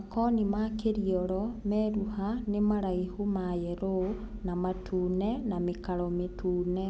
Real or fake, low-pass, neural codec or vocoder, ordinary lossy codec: real; none; none; none